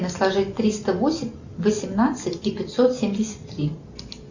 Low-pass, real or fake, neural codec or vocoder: 7.2 kHz; real; none